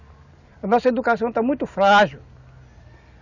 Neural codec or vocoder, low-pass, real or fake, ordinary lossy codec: none; 7.2 kHz; real; none